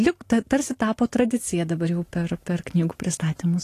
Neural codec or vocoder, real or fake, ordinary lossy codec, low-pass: none; real; AAC, 48 kbps; 14.4 kHz